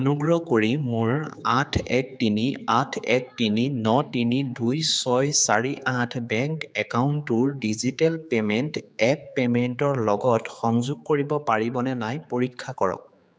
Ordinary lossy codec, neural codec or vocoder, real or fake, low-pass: none; codec, 16 kHz, 4 kbps, X-Codec, HuBERT features, trained on general audio; fake; none